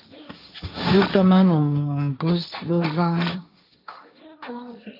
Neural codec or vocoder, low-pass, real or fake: codec, 16 kHz, 1.1 kbps, Voila-Tokenizer; 5.4 kHz; fake